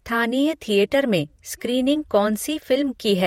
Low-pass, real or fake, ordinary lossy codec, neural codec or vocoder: 19.8 kHz; fake; MP3, 64 kbps; vocoder, 48 kHz, 128 mel bands, Vocos